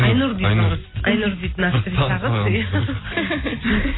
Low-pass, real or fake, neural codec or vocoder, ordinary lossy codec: 7.2 kHz; real; none; AAC, 16 kbps